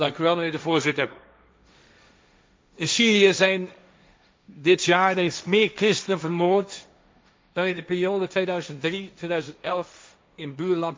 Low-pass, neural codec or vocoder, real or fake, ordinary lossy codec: none; codec, 16 kHz, 1.1 kbps, Voila-Tokenizer; fake; none